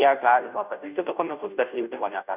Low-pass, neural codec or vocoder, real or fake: 3.6 kHz; codec, 16 kHz, 0.5 kbps, FunCodec, trained on Chinese and English, 25 frames a second; fake